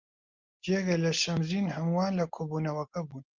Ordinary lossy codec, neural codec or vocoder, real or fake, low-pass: Opus, 24 kbps; none; real; 7.2 kHz